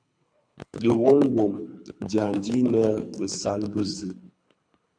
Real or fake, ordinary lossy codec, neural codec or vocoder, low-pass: fake; MP3, 96 kbps; codec, 24 kHz, 3 kbps, HILCodec; 9.9 kHz